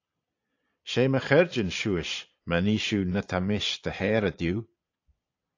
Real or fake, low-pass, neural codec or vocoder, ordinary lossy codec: fake; 7.2 kHz; vocoder, 22.05 kHz, 80 mel bands, Vocos; AAC, 48 kbps